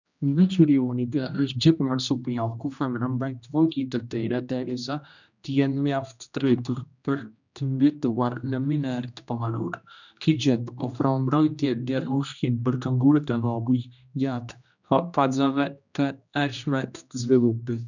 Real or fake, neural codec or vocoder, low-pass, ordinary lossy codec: fake; codec, 16 kHz, 1 kbps, X-Codec, HuBERT features, trained on general audio; 7.2 kHz; none